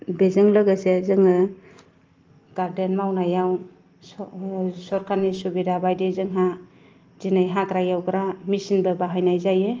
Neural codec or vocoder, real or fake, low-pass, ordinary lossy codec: none; real; 7.2 kHz; Opus, 24 kbps